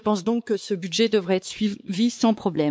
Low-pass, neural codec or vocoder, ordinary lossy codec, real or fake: none; codec, 16 kHz, 4 kbps, X-Codec, WavLM features, trained on Multilingual LibriSpeech; none; fake